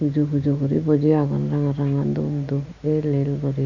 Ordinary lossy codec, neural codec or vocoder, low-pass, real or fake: none; none; 7.2 kHz; real